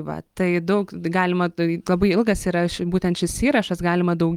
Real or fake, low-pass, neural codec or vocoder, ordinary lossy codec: real; 19.8 kHz; none; Opus, 32 kbps